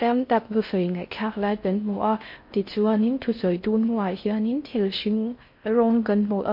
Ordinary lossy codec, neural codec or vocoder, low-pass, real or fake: MP3, 32 kbps; codec, 16 kHz in and 24 kHz out, 0.6 kbps, FocalCodec, streaming, 4096 codes; 5.4 kHz; fake